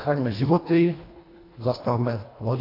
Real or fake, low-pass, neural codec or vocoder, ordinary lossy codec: fake; 5.4 kHz; codec, 24 kHz, 1.5 kbps, HILCodec; AAC, 24 kbps